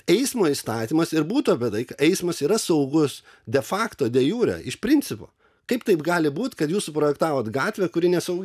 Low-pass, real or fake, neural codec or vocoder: 14.4 kHz; real; none